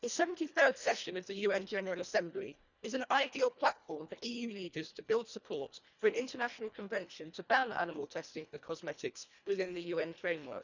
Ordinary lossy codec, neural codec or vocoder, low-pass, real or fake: none; codec, 24 kHz, 1.5 kbps, HILCodec; 7.2 kHz; fake